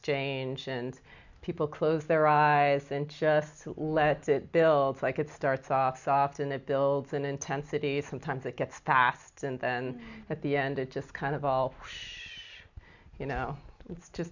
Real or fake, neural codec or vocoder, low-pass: real; none; 7.2 kHz